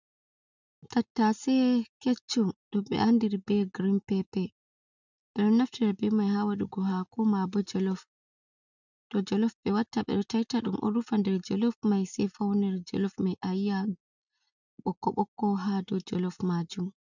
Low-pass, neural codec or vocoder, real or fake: 7.2 kHz; none; real